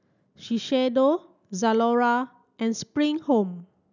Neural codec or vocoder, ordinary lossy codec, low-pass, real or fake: none; none; 7.2 kHz; real